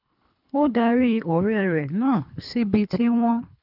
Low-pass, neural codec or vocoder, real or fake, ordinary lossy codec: 5.4 kHz; codec, 24 kHz, 3 kbps, HILCodec; fake; none